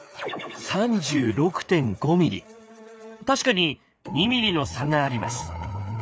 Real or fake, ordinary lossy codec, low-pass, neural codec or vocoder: fake; none; none; codec, 16 kHz, 4 kbps, FreqCodec, larger model